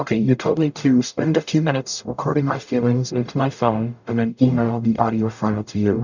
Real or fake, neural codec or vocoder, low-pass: fake; codec, 44.1 kHz, 0.9 kbps, DAC; 7.2 kHz